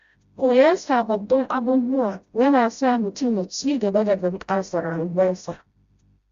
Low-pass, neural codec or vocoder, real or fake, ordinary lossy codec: 7.2 kHz; codec, 16 kHz, 0.5 kbps, FreqCodec, smaller model; fake; Opus, 64 kbps